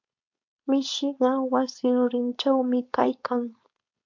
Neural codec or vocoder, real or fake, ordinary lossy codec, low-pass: codec, 16 kHz, 4.8 kbps, FACodec; fake; MP3, 48 kbps; 7.2 kHz